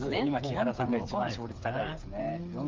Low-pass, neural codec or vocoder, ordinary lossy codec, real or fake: 7.2 kHz; codec, 16 kHz, 8 kbps, FreqCodec, smaller model; Opus, 24 kbps; fake